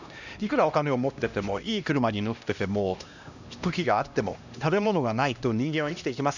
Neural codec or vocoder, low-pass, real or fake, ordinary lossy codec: codec, 16 kHz, 1 kbps, X-Codec, HuBERT features, trained on LibriSpeech; 7.2 kHz; fake; none